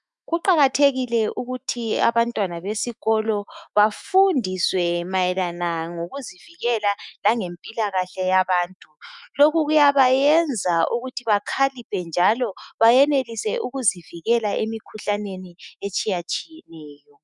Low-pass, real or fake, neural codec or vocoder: 10.8 kHz; fake; autoencoder, 48 kHz, 128 numbers a frame, DAC-VAE, trained on Japanese speech